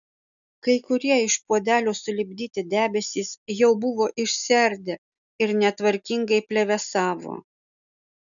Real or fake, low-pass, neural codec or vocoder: real; 7.2 kHz; none